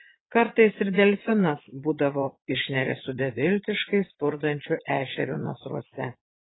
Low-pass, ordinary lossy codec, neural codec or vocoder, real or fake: 7.2 kHz; AAC, 16 kbps; vocoder, 22.05 kHz, 80 mel bands, Vocos; fake